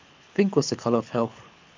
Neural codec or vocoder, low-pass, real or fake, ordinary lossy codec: codec, 24 kHz, 6 kbps, HILCodec; 7.2 kHz; fake; MP3, 48 kbps